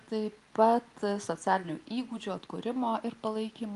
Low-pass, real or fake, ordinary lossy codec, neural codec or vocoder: 10.8 kHz; real; Opus, 32 kbps; none